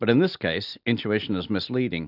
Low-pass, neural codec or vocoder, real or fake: 5.4 kHz; none; real